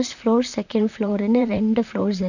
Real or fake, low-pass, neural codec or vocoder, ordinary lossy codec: fake; 7.2 kHz; vocoder, 44.1 kHz, 128 mel bands, Pupu-Vocoder; none